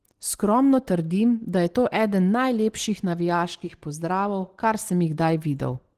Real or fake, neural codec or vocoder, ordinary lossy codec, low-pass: real; none; Opus, 16 kbps; 14.4 kHz